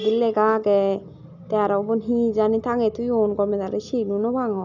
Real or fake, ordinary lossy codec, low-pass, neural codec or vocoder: real; none; 7.2 kHz; none